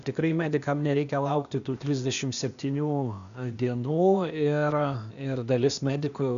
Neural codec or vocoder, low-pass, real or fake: codec, 16 kHz, 0.8 kbps, ZipCodec; 7.2 kHz; fake